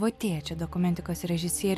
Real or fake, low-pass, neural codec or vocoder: real; 14.4 kHz; none